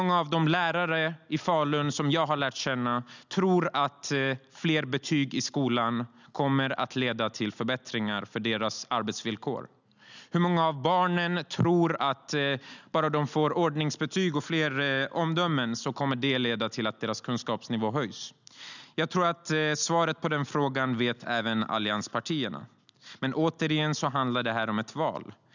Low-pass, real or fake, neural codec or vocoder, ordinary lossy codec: 7.2 kHz; real; none; none